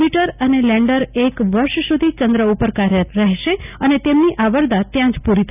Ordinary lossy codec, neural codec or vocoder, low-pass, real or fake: none; none; 3.6 kHz; real